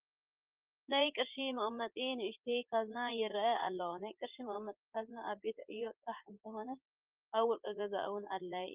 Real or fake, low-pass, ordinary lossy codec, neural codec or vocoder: fake; 3.6 kHz; Opus, 32 kbps; vocoder, 44.1 kHz, 80 mel bands, Vocos